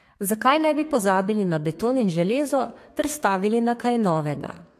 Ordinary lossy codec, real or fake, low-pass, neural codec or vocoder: AAC, 64 kbps; fake; 14.4 kHz; codec, 32 kHz, 1.9 kbps, SNAC